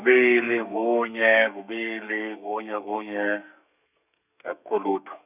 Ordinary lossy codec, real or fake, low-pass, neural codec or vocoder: none; fake; 3.6 kHz; codec, 32 kHz, 1.9 kbps, SNAC